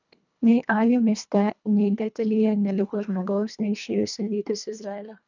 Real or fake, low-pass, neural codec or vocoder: fake; 7.2 kHz; codec, 24 kHz, 1.5 kbps, HILCodec